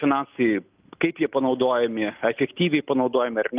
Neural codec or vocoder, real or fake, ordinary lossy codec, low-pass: none; real; Opus, 24 kbps; 3.6 kHz